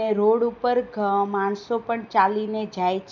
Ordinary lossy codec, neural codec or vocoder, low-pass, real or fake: none; none; 7.2 kHz; real